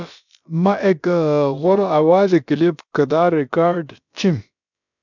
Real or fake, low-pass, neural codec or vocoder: fake; 7.2 kHz; codec, 16 kHz, about 1 kbps, DyCAST, with the encoder's durations